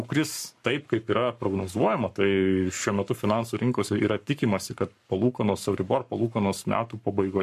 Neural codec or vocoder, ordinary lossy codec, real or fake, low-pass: codec, 44.1 kHz, 7.8 kbps, Pupu-Codec; MP3, 64 kbps; fake; 14.4 kHz